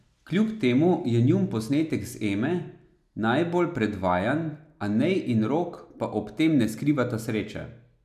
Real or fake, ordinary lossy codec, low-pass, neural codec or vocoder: real; none; 14.4 kHz; none